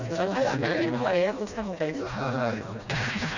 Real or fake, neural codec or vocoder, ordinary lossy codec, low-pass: fake; codec, 16 kHz, 1 kbps, FreqCodec, smaller model; none; 7.2 kHz